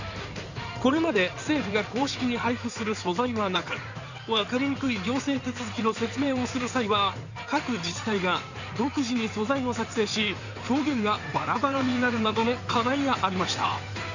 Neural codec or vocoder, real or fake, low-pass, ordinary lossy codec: codec, 16 kHz in and 24 kHz out, 2.2 kbps, FireRedTTS-2 codec; fake; 7.2 kHz; none